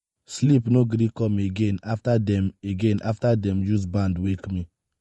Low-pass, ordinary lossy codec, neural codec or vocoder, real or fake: 19.8 kHz; MP3, 48 kbps; vocoder, 48 kHz, 128 mel bands, Vocos; fake